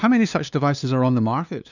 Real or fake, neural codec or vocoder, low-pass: fake; codec, 16 kHz, 2 kbps, FunCodec, trained on LibriTTS, 25 frames a second; 7.2 kHz